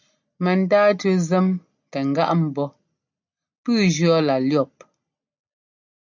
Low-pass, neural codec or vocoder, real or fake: 7.2 kHz; none; real